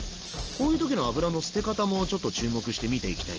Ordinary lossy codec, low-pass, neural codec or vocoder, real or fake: Opus, 16 kbps; 7.2 kHz; none; real